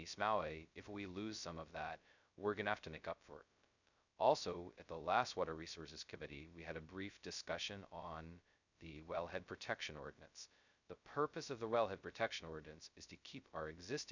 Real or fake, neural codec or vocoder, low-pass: fake; codec, 16 kHz, 0.2 kbps, FocalCodec; 7.2 kHz